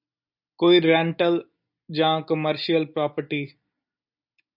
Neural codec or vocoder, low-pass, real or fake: none; 5.4 kHz; real